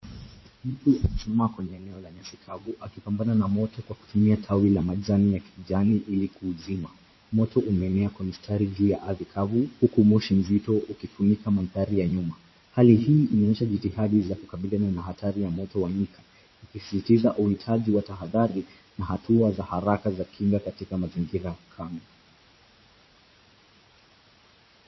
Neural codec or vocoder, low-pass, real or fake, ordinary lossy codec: vocoder, 44.1 kHz, 80 mel bands, Vocos; 7.2 kHz; fake; MP3, 24 kbps